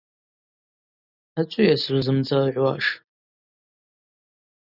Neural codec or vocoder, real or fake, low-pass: none; real; 5.4 kHz